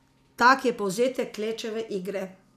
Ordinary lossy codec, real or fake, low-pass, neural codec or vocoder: none; real; 14.4 kHz; none